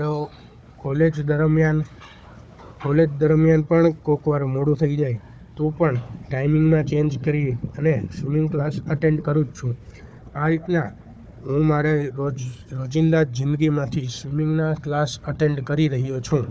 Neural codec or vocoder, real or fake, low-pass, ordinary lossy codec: codec, 16 kHz, 4 kbps, FunCodec, trained on Chinese and English, 50 frames a second; fake; none; none